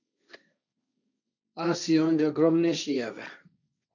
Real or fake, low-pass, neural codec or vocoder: fake; 7.2 kHz; codec, 16 kHz, 1.1 kbps, Voila-Tokenizer